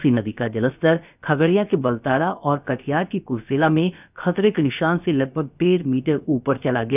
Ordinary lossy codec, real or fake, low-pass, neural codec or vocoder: none; fake; 3.6 kHz; codec, 16 kHz, about 1 kbps, DyCAST, with the encoder's durations